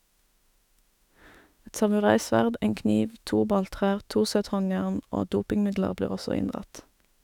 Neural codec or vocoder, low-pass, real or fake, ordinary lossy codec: autoencoder, 48 kHz, 32 numbers a frame, DAC-VAE, trained on Japanese speech; 19.8 kHz; fake; none